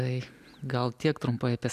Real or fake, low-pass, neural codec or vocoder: real; 14.4 kHz; none